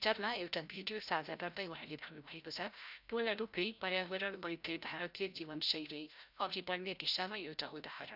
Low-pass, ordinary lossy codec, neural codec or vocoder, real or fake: 5.4 kHz; none; codec, 16 kHz, 0.5 kbps, FreqCodec, larger model; fake